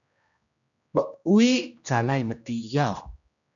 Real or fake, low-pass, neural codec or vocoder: fake; 7.2 kHz; codec, 16 kHz, 1 kbps, X-Codec, HuBERT features, trained on general audio